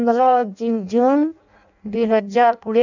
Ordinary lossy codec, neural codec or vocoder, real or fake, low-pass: none; codec, 16 kHz in and 24 kHz out, 0.6 kbps, FireRedTTS-2 codec; fake; 7.2 kHz